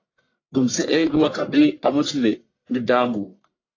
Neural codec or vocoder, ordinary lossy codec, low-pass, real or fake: codec, 44.1 kHz, 1.7 kbps, Pupu-Codec; AAC, 32 kbps; 7.2 kHz; fake